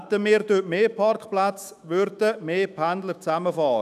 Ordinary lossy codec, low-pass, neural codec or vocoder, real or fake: none; 14.4 kHz; autoencoder, 48 kHz, 128 numbers a frame, DAC-VAE, trained on Japanese speech; fake